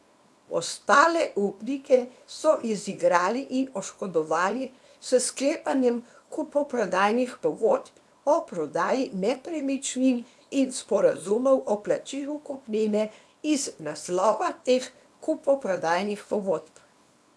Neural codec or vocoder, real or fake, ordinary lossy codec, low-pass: codec, 24 kHz, 0.9 kbps, WavTokenizer, small release; fake; none; none